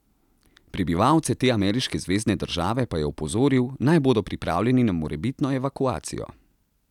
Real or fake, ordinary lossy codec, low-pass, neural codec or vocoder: real; none; 19.8 kHz; none